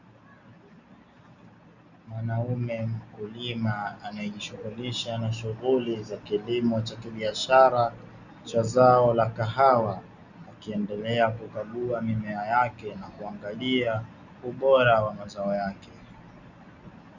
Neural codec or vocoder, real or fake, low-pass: none; real; 7.2 kHz